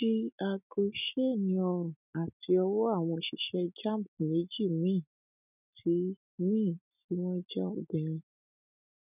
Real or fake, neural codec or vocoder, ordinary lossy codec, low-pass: real; none; none; 3.6 kHz